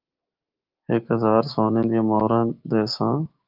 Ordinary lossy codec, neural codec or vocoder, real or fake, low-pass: Opus, 32 kbps; codec, 16 kHz, 6 kbps, DAC; fake; 5.4 kHz